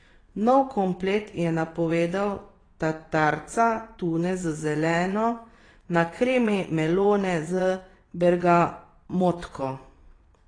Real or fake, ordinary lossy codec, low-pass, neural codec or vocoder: fake; AAC, 32 kbps; 9.9 kHz; vocoder, 22.05 kHz, 80 mel bands, WaveNeXt